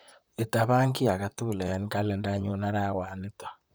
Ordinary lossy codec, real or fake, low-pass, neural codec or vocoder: none; fake; none; vocoder, 44.1 kHz, 128 mel bands, Pupu-Vocoder